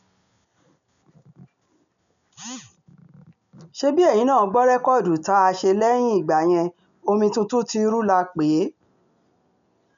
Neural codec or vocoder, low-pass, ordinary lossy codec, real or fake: none; 7.2 kHz; none; real